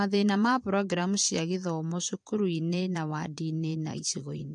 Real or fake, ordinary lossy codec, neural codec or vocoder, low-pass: fake; MP3, 64 kbps; vocoder, 22.05 kHz, 80 mel bands, Vocos; 9.9 kHz